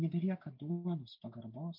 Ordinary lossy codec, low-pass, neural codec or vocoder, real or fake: MP3, 48 kbps; 5.4 kHz; none; real